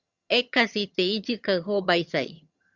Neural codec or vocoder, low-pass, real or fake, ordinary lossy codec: vocoder, 22.05 kHz, 80 mel bands, HiFi-GAN; 7.2 kHz; fake; Opus, 64 kbps